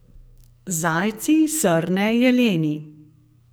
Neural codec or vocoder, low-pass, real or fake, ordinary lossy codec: codec, 44.1 kHz, 2.6 kbps, SNAC; none; fake; none